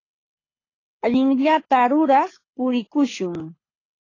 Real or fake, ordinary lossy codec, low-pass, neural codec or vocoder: fake; AAC, 32 kbps; 7.2 kHz; codec, 24 kHz, 6 kbps, HILCodec